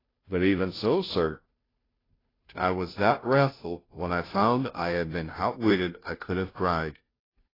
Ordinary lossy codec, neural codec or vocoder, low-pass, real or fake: AAC, 24 kbps; codec, 16 kHz, 0.5 kbps, FunCodec, trained on Chinese and English, 25 frames a second; 5.4 kHz; fake